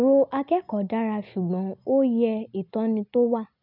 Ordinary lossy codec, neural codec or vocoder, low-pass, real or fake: none; none; 5.4 kHz; real